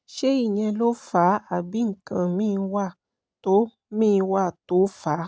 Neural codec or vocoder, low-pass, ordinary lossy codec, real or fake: none; none; none; real